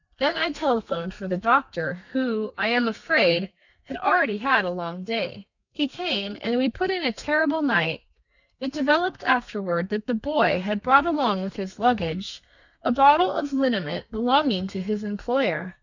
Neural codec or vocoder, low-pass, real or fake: codec, 32 kHz, 1.9 kbps, SNAC; 7.2 kHz; fake